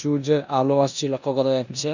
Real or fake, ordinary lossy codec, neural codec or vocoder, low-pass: fake; none; codec, 16 kHz in and 24 kHz out, 0.9 kbps, LongCat-Audio-Codec, four codebook decoder; 7.2 kHz